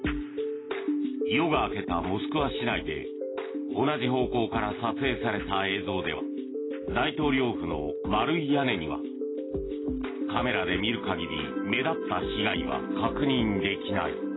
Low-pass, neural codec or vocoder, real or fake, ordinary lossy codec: 7.2 kHz; none; real; AAC, 16 kbps